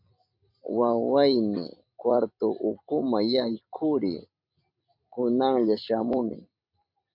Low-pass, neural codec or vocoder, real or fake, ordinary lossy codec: 5.4 kHz; vocoder, 44.1 kHz, 128 mel bands every 256 samples, BigVGAN v2; fake; MP3, 48 kbps